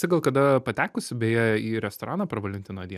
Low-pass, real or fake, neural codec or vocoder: 14.4 kHz; real; none